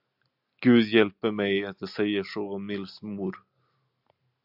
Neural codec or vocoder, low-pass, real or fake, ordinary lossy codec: none; 5.4 kHz; real; AAC, 48 kbps